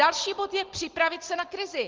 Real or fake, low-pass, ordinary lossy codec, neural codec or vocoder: real; 7.2 kHz; Opus, 16 kbps; none